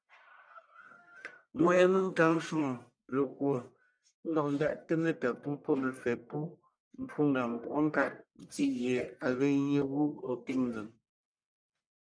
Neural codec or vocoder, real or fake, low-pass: codec, 44.1 kHz, 1.7 kbps, Pupu-Codec; fake; 9.9 kHz